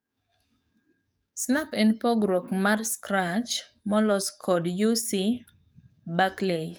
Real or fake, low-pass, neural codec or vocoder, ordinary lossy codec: fake; none; codec, 44.1 kHz, 7.8 kbps, DAC; none